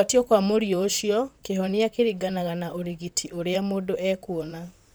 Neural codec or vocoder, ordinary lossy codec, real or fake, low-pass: vocoder, 44.1 kHz, 128 mel bands, Pupu-Vocoder; none; fake; none